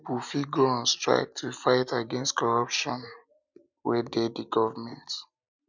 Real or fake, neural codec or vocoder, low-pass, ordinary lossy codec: real; none; 7.2 kHz; none